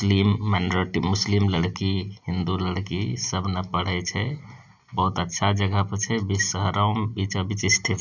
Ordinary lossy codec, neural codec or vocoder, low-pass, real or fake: none; none; 7.2 kHz; real